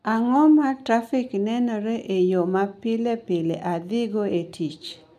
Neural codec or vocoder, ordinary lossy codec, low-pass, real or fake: none; none; 14.4 kHz; real